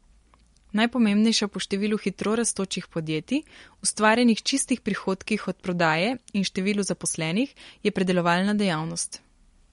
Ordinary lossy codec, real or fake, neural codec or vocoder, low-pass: MP3, 48 kbps; real; none; 10.8 kHz